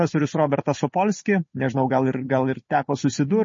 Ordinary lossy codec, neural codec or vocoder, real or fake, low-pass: MP3, 32 kbps; none; real; 7.2 kHz